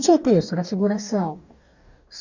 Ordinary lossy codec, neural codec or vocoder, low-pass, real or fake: none; codec, 44.1 kHz, 2.6 kbps, DAC; 7.2 kHz; fake